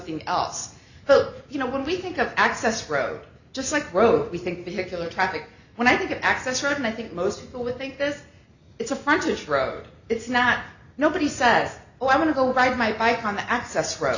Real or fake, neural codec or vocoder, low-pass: real; none; 7.2 kHz